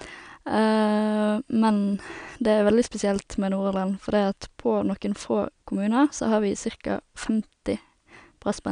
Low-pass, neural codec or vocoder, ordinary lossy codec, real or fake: 9.9 kHz; none; none; real